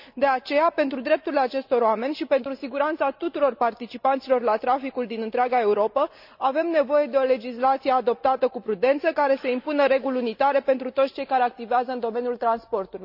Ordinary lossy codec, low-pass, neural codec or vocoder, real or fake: none; 5.4 kHz; none; real